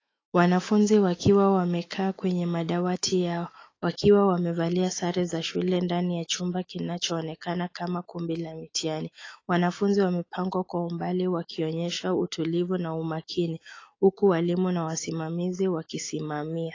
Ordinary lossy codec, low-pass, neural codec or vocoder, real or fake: AAC, 32 kbps; 7.2 kHz; autoencoder, 48 kHz, 128 numbers a frame, DAC-VAE, trained on Japanese speech; fake